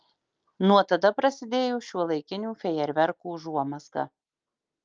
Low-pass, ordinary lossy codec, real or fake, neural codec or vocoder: 7.2 kHz; Opus, 24 kbps; real; none